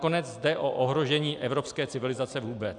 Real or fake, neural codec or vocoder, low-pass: real; none; 9.9 kHz